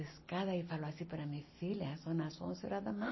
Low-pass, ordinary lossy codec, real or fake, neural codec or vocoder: 7.2 kHz; MP3, 24 kbps; real; none